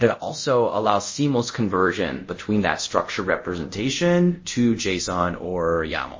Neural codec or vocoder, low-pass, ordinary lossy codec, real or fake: codec, 24 kHz, 0.5 kbps, DualCodec; 7.2 kHz; MP3, 32 kbps; fake